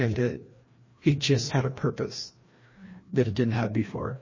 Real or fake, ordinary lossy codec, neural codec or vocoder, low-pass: fake; MP3, 32 kbps; codec, 16 kHz, 1 kbps, FreqCodec, larger model; 7.2 kHz